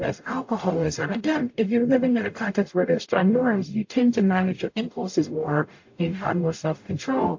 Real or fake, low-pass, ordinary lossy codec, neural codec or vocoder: fake; 7.2 kHz; AAC, 48 kbps; codec, 44.1 kHz, 0.9 kbps, DAC